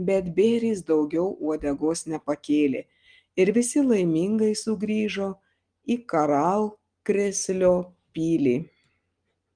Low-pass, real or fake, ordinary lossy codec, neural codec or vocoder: 9.9 kHz; real; Opus, 24 kbps; none